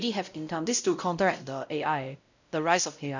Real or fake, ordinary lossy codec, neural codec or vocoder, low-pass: fake; none; codec, 16 kHz, 0.5 kbps, X-Codec, WavLM features, trained on Multilingual LibriSpeech; 7.2 kHz